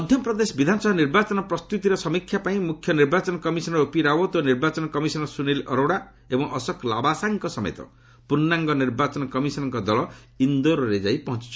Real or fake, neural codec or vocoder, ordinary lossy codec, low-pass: real; none; none; none